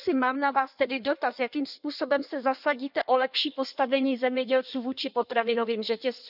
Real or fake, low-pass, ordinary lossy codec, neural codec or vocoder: fake; 5.4 kHz; none; codec, 16 kHz in and 24 kHz out, 1.1 kbps, FireRedTTS-2 codec